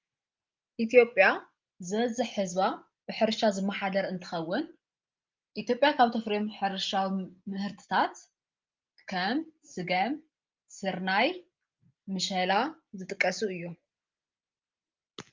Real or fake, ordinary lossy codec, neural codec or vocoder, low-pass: real; Opus, 24 kbps; none; 7.2 kHz